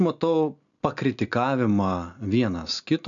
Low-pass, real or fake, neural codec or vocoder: 7.2 kHz; real; none